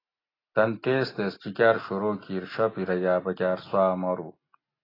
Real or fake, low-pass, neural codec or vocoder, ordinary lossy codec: real; 5.4 kHz; none; AAC, 24 kbps